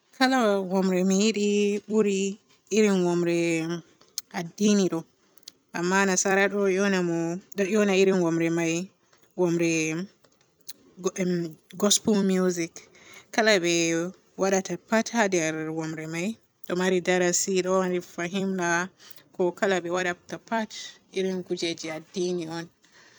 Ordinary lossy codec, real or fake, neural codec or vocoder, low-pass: none; fake; vocoder, 44.1 kHz, 128 mel bands every 256 samples, BigVGAN v2; none